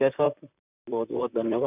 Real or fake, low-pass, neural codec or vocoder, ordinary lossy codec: fake; 3.6 kHz; vocoder, 44.1 kHz, 128 mel bands, Pupu-Vocoder; none